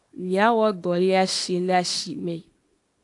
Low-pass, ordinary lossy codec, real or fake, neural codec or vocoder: 10.8 kHz; AAC, 64 kbps; fake; codec, 24 kHz, 0.9 kbps, WavTokenizer, small release